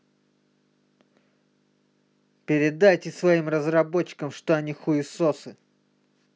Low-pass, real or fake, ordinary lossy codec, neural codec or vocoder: none; real; none; none